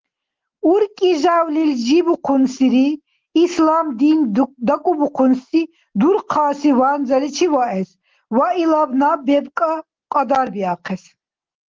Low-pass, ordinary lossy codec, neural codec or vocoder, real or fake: 7.2 kHz; Opus, 16 kbps; none; real